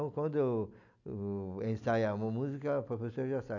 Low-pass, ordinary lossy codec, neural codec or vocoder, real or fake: 7.2 kHz; none; none; real